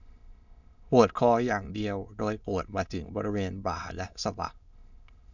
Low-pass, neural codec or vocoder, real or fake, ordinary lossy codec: 7.2 kHz; autoencoder, 22.05 kHz, a latent of 192 numbers a frame, VITS, trained on many speakers; fake; none